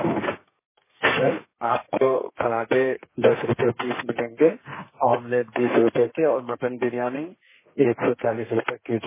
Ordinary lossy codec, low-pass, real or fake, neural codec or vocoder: MP3, 16 kbps; 3.6 kHz; fake; codec, 32 kHz, 1.9 kbps, SNAC